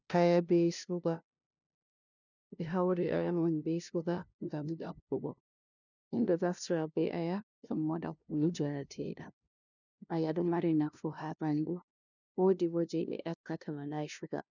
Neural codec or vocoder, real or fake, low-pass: codec, 16 kHz, 0.5 kbps, FunCodec, trained on LibriTTS, 25 frames a second; fake; 7.2 kHz